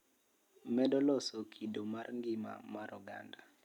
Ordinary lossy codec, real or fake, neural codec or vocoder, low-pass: none; real; none; 19.8 kHz